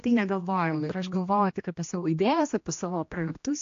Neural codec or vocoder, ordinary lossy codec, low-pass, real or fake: codec, 16 kHz, 1 kbps, X-Codec, HuBERT features, trained on general audio; AAC, 48 kbps; 7.2 kHz; fake